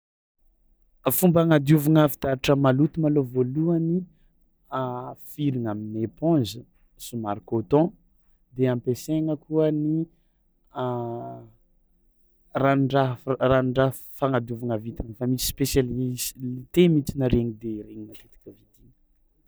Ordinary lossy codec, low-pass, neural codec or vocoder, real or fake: none; none; none; real